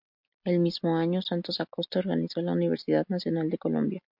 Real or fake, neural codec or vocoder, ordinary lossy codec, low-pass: real; none; MP3, 48 kbps; 5.4 kHz